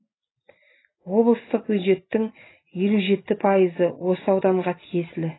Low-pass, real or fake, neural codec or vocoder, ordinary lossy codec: 7.2 kHz; real; none; AAC, 16 kbps